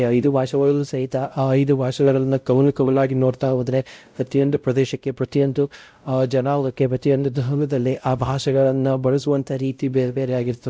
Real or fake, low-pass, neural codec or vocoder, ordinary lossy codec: fake; none; codec, 16 kHz, 0.5 kbps, X-Codec, WavLM features, trained on Multilingual LibriSpeech; none